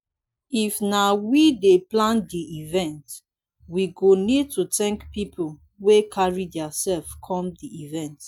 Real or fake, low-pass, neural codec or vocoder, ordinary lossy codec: real; 19.8 kHz; none; none